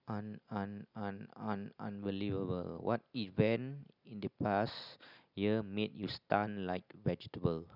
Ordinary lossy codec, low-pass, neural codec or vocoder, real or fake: none; 5.4 kHz; none; real